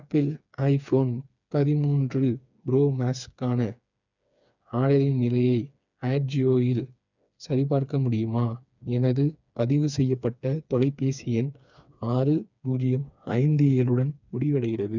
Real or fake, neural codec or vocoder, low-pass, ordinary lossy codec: fake; codec, 16 kHz, 4 kbps, FreqCodec, smaller model; 7.2 kHz; none